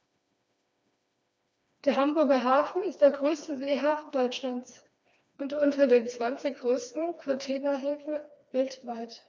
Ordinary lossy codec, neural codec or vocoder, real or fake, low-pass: none; codec, 16 kHz, 2 kbps, FreqCodec, smaller model; fake; none